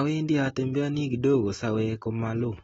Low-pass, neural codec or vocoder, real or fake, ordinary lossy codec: 10.8 kHz; none; real; AAC, 24 kbps